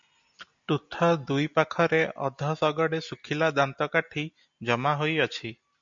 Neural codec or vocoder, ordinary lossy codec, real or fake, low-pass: none; MP3, 64 kbps; real; 7.2 kHz